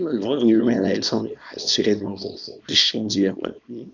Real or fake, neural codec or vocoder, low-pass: fake; codec, 24 kHz, 0.9 kbps, WavTokenizer, small release; 7.2 kHz